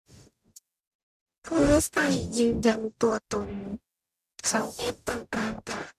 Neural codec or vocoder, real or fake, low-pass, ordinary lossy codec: codec, 44.1 kHz, 0.9 kbps, DAC; fake; 14.4 kHz; MP3, 96 kbps